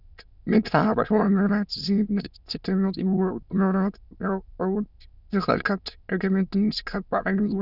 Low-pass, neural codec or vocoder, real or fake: 5.4 kHz; autoencoder, 22.05 kHz, a latent of 192 numbers a frame, VITS, trained on many speakers; fake